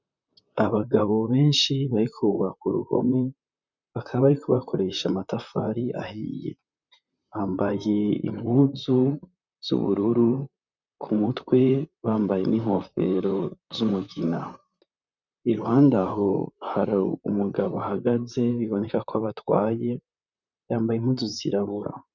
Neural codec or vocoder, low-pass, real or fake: vocoder, 44.1 kHz, 128 mel bands, Pupu-Vocoder; 7.2 kHz; fake